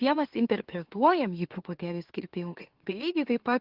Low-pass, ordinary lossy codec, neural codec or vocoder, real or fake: 5.4 kHz; Opus, 16 kbps; autoencoder, 44.1 kHz, a latent of 192 numbers a frame, MeloTTS; fake